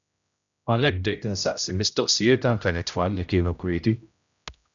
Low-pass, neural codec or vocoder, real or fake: 7.2 kHz; codec, 16 kHz, 0.5 kbps, X-Codec, HuBERT features, trained on general audio; fake